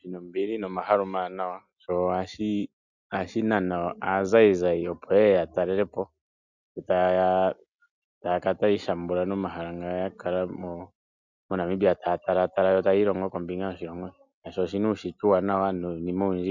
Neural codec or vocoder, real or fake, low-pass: none; real; 7.2 kHz